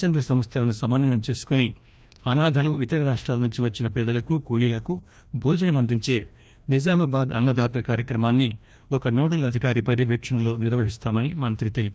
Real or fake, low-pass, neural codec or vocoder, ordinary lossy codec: fake; none; codec, 16 kHz, 1 kbps, FreqCodec, larger model; none